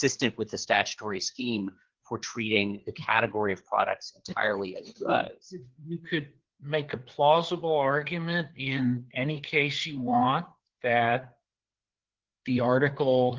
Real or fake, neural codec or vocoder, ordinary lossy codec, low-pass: fake; codec, 16 kHz, 4 kbps, FreqCodec, larger model; Opus, 16 kbps; 7.2 kHz